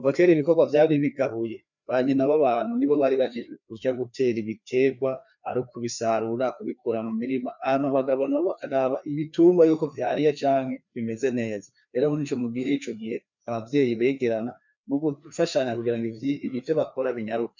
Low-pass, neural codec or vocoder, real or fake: 7.2 kHz; codec, 16 kHz, 2 kbps, FreqCodec, larger model; fake